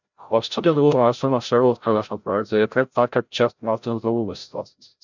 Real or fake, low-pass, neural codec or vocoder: fake; 7.2 kHz; codec, 16 kHz, 0.5 kbps, FreqCodec, larger model